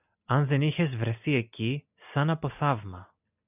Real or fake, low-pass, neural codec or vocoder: real; 3.6 kHz; none